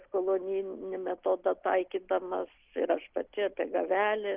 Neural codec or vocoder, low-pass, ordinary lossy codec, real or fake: none; 3.6 kHz; Opus, 32 kbps; real